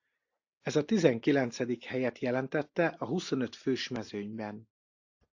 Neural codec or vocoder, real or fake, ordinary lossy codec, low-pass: none; real; AAC, 48 kbps; 7.2 kHz